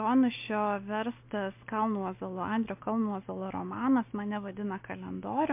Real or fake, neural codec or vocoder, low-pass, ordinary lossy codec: real; none; 3.6 kHz; MP3, 24 kbps